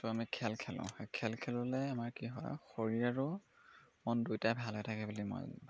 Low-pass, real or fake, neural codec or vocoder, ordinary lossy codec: none; real; none; none